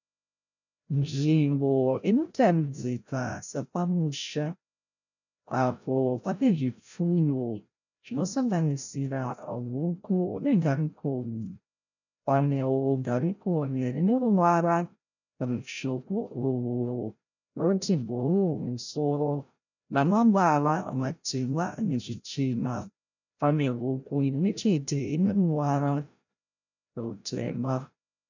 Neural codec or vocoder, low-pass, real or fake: codec, 16 kHz, 0.5 kbps, FreqCodec, larger model; 7.2 kHz; fake